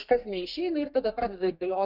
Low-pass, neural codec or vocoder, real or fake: 5.4 kHz; codec, 32 kHz, 1.9 kbps, SNAC; fake